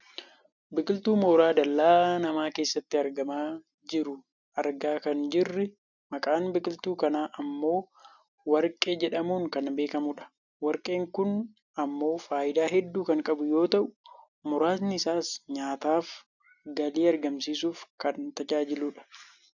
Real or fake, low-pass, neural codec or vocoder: real; 7.2 kHz; none